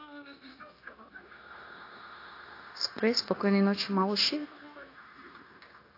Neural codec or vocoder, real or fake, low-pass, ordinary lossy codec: codec, 16 kHz, 0.9 kbps, LongCat-Audio-Codec; fake; 5.4 kHz; AAC, 32 kbps